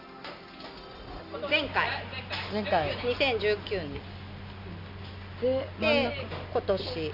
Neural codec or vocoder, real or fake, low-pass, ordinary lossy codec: none; real; 5.4 kHz; none